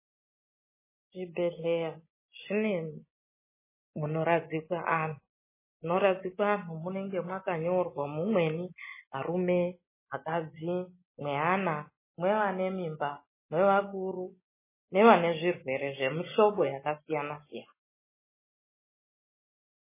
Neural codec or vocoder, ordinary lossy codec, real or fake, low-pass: none; MP3, 16 kbps; real; 3.6 kHz